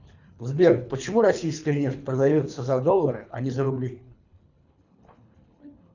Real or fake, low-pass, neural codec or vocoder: fake; 7.2 kHz; codec, 24 kHz, 3 kbps, HILCodec